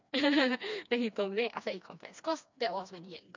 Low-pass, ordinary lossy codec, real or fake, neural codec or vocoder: 7.2 kHz; MP3, 64 kbps; fake; codec, 16 kHz, 2 kbps, FreqCodec, smaller model